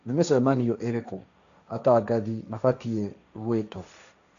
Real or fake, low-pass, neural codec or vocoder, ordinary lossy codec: fake; 7.2 kHz; codec, 16 kHz, 1.1 kbps, Voila-Tokenizer; none